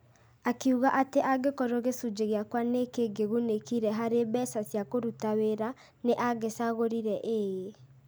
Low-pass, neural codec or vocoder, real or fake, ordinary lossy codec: none; none; real; none